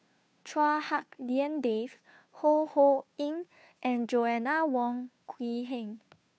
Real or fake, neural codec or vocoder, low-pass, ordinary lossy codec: fake; codec, 16 kHz, 2 kbps, FunCodec, trained on Chinese and English, 25 frames a second; none; none